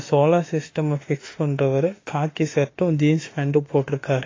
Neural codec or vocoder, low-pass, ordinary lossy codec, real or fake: autoencoder, 48 kHz, 32 numbers a frame, DAC-VAE, trained on Japanese speech; 7.2 kHz; AAC, 32 kbps; fake